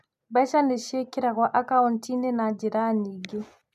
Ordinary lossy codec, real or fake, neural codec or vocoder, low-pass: none; real; none; 14.4 kHz